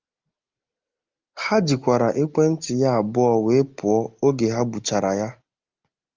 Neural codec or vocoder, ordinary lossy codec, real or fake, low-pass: none; Opus, 32 kbps; real; 7.2 kHz